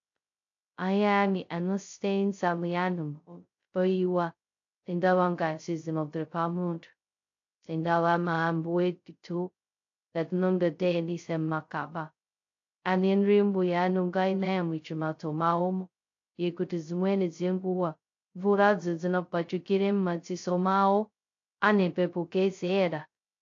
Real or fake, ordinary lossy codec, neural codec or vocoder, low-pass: fake; AAC, 48 kbps; codec, 16 kHz, 0.2 kbps, FocalCodec; 7.2 kHz